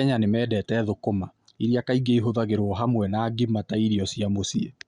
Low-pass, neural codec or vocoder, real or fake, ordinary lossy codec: 9.9 kHz; none; real; Opus, 64 kbps